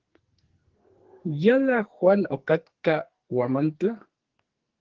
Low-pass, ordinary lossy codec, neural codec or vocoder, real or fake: 7.2 kHz; Opus, 16 kbps; codec, 44.1 kHz, 2.6 kbps, SNAC; fake